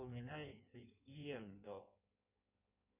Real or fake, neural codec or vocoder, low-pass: fake; codec, 16 kHz in and 24 kHz out, 1.1 kbps, FireRedTTS-2 codec; 3.6 kHz